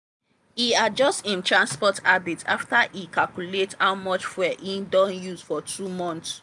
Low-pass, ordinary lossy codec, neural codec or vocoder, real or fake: 10.8 kHz; none; none; real